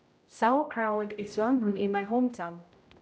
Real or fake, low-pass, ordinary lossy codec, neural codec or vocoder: fake; none; none; codec, 16 kHz, 0.5 kbps, X-Codec, HuBERT features, trained on general audio